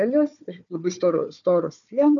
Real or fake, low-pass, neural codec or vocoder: fake; 7.2 kHz; codec, 16 kHz, 4 kbps, FunCodec, trained on Chinese and English, 50 frames a second